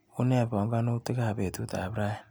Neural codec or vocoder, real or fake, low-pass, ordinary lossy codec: none; real; none; none